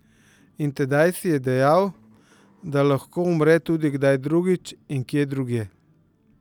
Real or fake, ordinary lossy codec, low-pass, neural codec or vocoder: real; none; 19.8 kHz; none